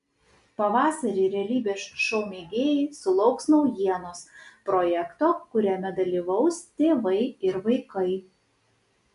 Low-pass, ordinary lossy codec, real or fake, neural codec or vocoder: 10.8 kHz; AAC, 96 kbps; real; none